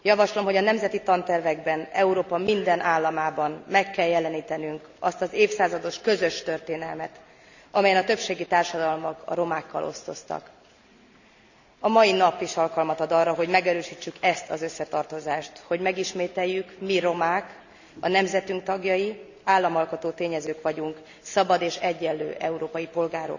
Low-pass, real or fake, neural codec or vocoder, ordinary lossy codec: 7.2 kHz; real; none; none